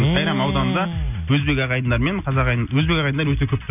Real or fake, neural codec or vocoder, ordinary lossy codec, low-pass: real; none; none; 3.6 kHz